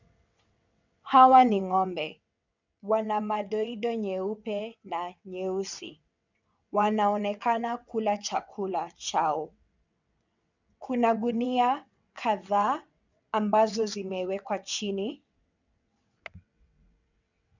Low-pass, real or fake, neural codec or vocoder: 7.2 kHz; fake; vocoder, 22.05 kHz, 80 mel bands, WaveNeXt